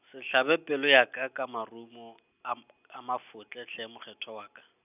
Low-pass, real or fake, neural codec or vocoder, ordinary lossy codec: 3.6 kHz; real; none; none